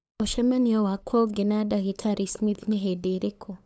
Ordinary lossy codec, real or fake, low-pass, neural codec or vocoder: none; fake; none; codec, 16 kHz, 8 kbps, FunCodec, trained on LibriTTS, 25 frames a second